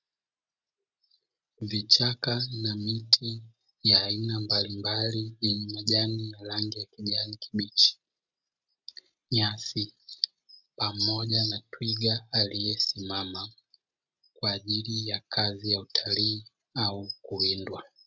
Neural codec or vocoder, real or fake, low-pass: none; real; 7.2 kHz